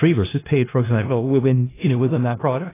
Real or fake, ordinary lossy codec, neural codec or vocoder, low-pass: fake; AAC, 16 kbps; codec, 16 kHz in and 24 kHz out, 0.4 kbps, LongCat-Audio-Codec, four codebook decoder; 3.6 kHz